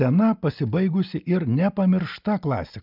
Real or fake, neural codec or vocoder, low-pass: real; none; 5.4 kHz